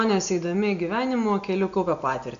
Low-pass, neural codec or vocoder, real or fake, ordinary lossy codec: 7.2 kHz; none; real; AAC, 96 kbps